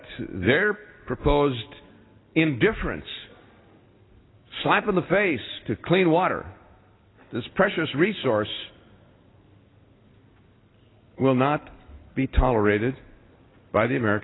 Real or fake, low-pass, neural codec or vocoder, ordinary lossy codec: real; 7.2 kHz; none; AAC, 16 kbps